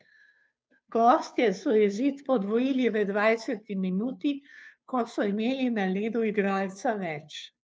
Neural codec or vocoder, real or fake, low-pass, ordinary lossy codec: codec, 16 kHz, 2 kbps, FunCodec, trained on Chinese and English, 25 frames a second; fake; none; none